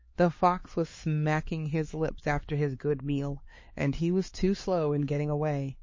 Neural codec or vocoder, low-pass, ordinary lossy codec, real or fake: codec, 16 kHz, 4 kbps, X-Codec, HuBERT features, trained on LibriSpeech; 7.2 kHz; MP3, 32 kbps; fake